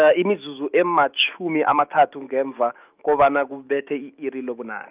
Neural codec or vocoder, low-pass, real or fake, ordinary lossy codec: autoencoder, 48 kHz, 128 numbers a frame, DAC-VAE, trained on Japanese speech; 3.6 kHz; fake; Opus, 24 kbps